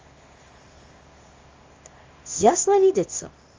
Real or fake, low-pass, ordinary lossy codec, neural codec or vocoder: fake; 7.2 kHz; Opus, 32 kbps; codec, 24 kHz, 0.9 kbps, WavTokenizer, small release